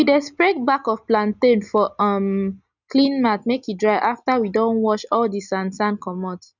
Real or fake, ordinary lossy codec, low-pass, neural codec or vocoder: real; none; 7.2 kHz; none